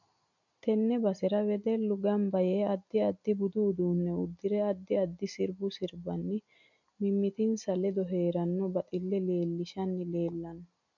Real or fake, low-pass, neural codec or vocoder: real; 7.2 kHz; none